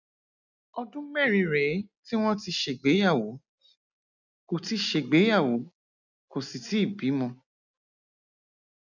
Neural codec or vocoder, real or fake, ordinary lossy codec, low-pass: none; real; none; 7.2 kHz